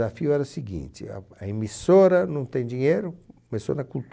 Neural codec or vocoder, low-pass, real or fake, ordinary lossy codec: none; none; real; none